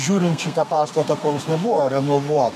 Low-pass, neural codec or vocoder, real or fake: 14.4 kHz; codec, 32 kHz, 1.9 kbps, SNAC; fake